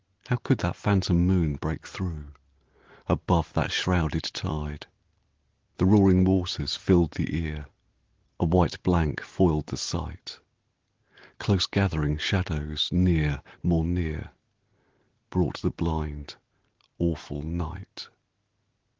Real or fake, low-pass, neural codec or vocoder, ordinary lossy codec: real; 7.2 kHz; none; Opus, 16 kbps